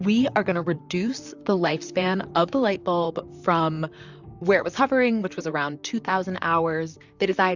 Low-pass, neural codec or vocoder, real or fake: 7.2 kHz; vocoder, 44.1 kHz, 128 mel bands, Pupu-Vocoder; fake